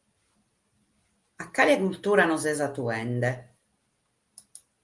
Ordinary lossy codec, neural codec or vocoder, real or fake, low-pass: Opus, 32 kbps; none; real; 10.8 kHz